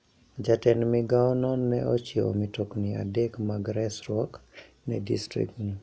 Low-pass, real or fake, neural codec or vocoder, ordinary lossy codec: none; real; none; none